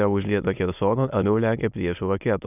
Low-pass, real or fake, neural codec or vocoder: 3.6 kHz; fake; autoencoder, 22.05 kHz, a latent of 192 numbers a frame, VITS, trained on many speakers